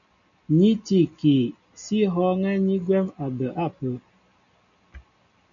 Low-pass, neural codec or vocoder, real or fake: 7.2 kHz; none; real